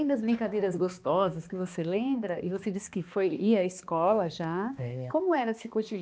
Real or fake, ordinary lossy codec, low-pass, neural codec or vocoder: fake; none; none; codec, 16 kHz, 2 kbps, X-Codec, HuBERT features, trained on balanced general audio